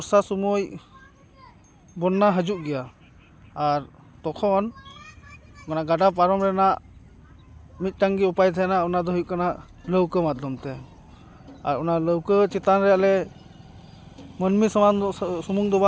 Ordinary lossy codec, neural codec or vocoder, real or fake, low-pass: none; none; real; none